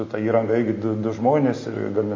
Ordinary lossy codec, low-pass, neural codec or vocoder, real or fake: MP3, 32 kbps; 7.2 kHz; none; real